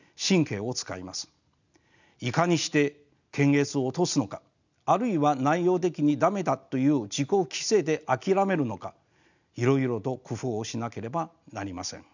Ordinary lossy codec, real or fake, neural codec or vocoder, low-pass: none; real; none; 7.2 kHz